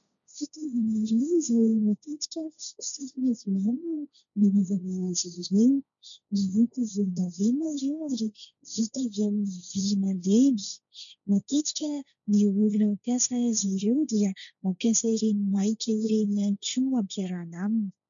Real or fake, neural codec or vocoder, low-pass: fake; codec, 16 kHz, 1.1 kbps, Voila-Tokenizer; 7.2 kHz